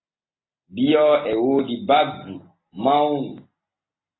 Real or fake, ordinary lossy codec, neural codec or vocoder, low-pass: real; AAC, 16 kbps; none; 7.2 kHz